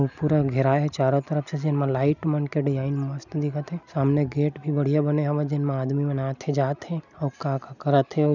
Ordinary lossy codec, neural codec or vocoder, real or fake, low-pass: none; none; real; 7.2 kHz